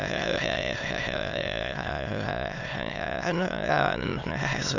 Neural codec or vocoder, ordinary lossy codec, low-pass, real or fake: autoencoder, 22.05 kHz, a latent of 192 numbers a frame, VITS, trained on many speakers; none; 7.2 kHz; fake